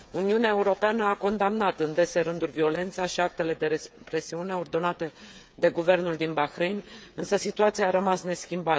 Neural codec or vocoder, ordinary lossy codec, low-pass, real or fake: codec, 16 kHz, 8 kbps, FreqCodec, smaller model; none; none; fake